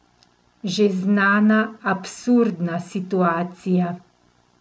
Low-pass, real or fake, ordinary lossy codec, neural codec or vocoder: none; real; none; none